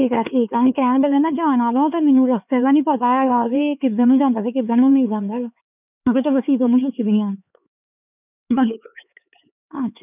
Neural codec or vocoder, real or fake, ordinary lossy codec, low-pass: codec, 16 kHz, 4 kbps, X-Codec, HuBERT features, trained on LibriSpeech; fake; none; 3.6 kHz